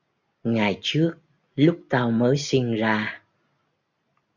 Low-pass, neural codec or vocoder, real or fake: 7.2 kHz; none; real